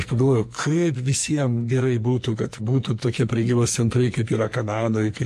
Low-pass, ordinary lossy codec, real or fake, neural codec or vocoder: 14.4 kHz; MP3, 64 kbps; fake; codec, 44.1 kHz, 2.6 kbps, SNAC